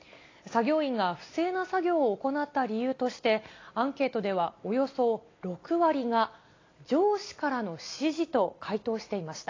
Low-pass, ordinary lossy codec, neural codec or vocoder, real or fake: 7.2 kHz; AAC, 32 kbps; none; real